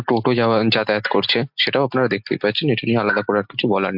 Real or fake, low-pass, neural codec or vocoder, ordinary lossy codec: real; 5.4 kHz; none; MP3, 48 kbps